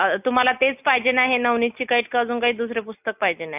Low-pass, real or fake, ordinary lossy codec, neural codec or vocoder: 3.6 kHz; real; none; none